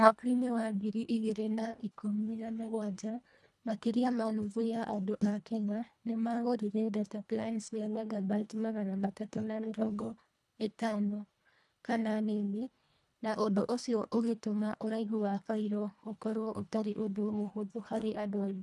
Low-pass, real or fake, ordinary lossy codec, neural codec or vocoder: none; fake; none; codec, 24 kHz, 1.5 kbps, HILCodec